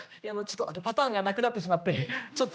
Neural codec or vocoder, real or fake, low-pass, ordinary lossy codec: codec, 16 kHz, 1 kbps, X-Codec, HuBERT features, trained on general audio; fake; none; none